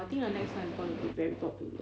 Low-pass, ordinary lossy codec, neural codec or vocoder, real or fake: none; none; none; real